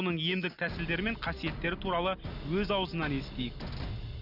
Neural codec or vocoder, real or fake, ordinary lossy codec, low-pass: none; real; none; 5.4 kHz